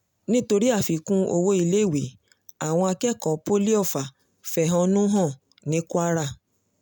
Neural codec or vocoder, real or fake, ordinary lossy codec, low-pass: none; real; none; none